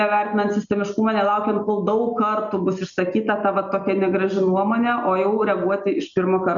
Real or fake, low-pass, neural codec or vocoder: real; 7.2 kHz; none